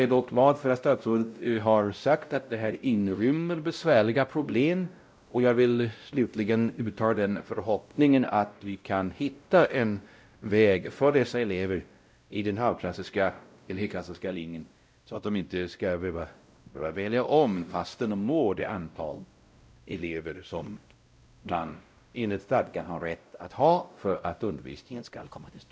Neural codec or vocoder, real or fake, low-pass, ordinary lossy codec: codec, 16 kHz, 0.5 kbps, X-Codec, WavLM features, trained on Multilingual LibriSpeech; fake; none; none